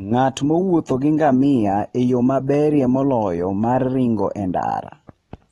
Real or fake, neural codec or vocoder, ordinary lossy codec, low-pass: real; none; AAC, 32 kbps; 19.8 kHz